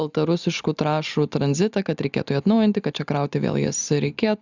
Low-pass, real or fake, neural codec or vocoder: 7.2 kHz; real; none